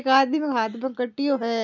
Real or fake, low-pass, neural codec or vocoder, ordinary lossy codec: real; 7.2 kHz; none; none